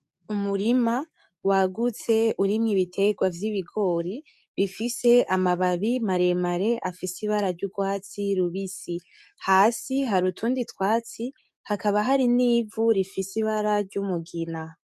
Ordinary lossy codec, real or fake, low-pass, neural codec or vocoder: MP3, 96 kbps; fake; 14.4 kHz; codec, 44.1 kHz, 7.8 kbps, DAC